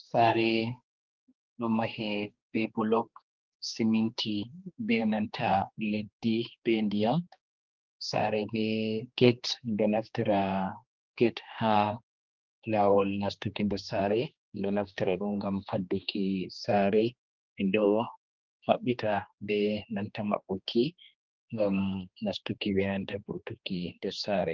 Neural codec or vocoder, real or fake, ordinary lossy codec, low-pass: codec, 16 kHz, 2 kbps, X-Codec, HuBERT features, trained on general audio; fake; Opus, 32 kbps; 7.2 kHz